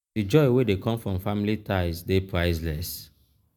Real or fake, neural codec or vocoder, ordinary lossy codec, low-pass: real; none; none; none